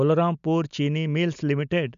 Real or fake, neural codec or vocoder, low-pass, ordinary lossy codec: real; none; 7.2 kHz; none